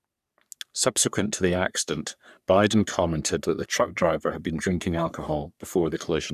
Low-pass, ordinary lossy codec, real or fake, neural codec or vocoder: 14.4 kHz; none; fake; codec, 44.1 kHz, 3.4 kbps, Pupu-Codec